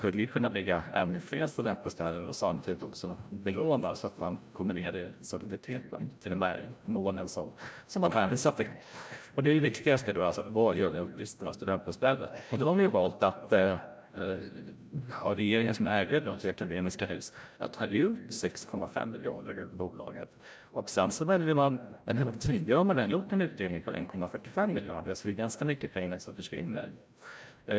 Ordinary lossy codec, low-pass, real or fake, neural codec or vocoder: none; none; fake; codec, 16 kHz, 0.5 kbps, FreqCodec, larger model